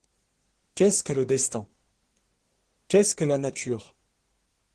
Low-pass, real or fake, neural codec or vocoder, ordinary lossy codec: 10.8 kHz; fake; codec, 24 kHz, 1 kbps, SNAC; Opus, 16 kbps